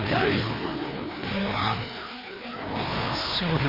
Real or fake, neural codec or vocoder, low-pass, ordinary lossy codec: fake; codec, 16 kHz, 2 kbps, FreqCodec, larger model; 5.4 kHz; MP3, 24 kbps